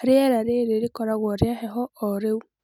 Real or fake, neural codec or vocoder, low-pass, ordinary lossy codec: real; none; 19.8 kHz; none